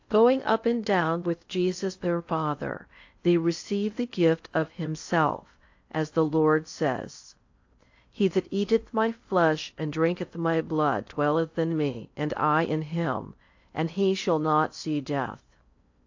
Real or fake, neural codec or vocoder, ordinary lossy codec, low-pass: fake; codec, 16 kHz in and 24 kHz out, 0.6 kbps, FocalCodec, streaming, 2048 codes; AAC, 48 kbps; 7.2 kHz